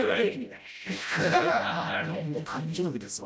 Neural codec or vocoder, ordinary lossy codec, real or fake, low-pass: codec, 16 kHz, 0.5 kbps, FreqCodec, smaller model; none; fake; none